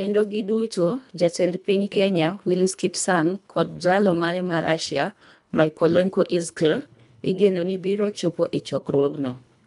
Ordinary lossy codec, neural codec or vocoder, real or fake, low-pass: MP3, 96 kbps; codec, 24 kHz, 1.5 kbps, HILCodec; fake; 10.8 kHz